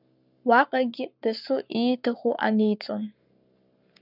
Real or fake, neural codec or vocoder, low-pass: fake; codec, 44.1 kHz, 7.8 kbps, Pupu-Codec; 5.4 kHz